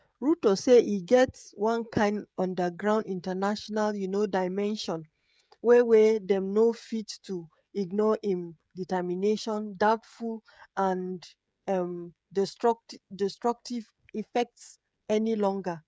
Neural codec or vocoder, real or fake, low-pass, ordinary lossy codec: codec, 16 kHz, 16 kbps, FreqCodec, smaller model; fake; none; none